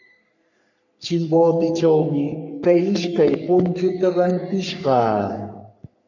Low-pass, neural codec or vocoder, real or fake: 7.2 kHz; codec, 44.1 kHz, 3.4 kbps, Pupu-Codec; fake